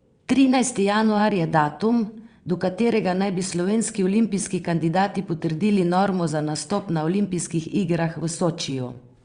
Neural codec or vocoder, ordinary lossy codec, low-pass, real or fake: vocoder, 22.05 kHz, 80 mel bands, WaveNeXt; Opus, 64 kbps; 9.9 kHz; fake